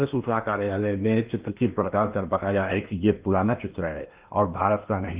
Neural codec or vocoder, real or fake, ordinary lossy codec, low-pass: codec, 16 kHz in and 24 kHz out, 0.8 kbps, FocalCodec, streaming, 65536 codes; fake; Opus, 32 kbps; 3.6 kHz